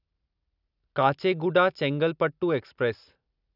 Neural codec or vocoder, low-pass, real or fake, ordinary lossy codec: none; 5.4 kHz; real; none